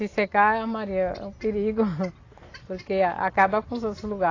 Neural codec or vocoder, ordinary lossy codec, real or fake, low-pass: none; AAC, 32 kbps; real; 7.2 kHz